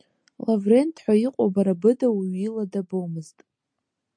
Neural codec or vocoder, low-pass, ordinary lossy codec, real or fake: none; 9.9 kHz; MP3, 48 kbps; real